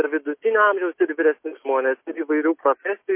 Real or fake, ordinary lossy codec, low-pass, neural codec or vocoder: real; MP3, 24 kbps; 3.6 kHz; none